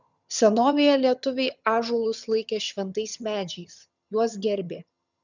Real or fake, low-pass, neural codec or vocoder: fake; 7.2 kHz; vocoder, 22.05 kHz, 80 mel bands, HiFi-GAN